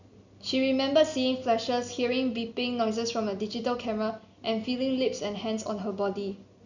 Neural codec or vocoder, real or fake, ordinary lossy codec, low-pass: none; real; none; 7.2 kHz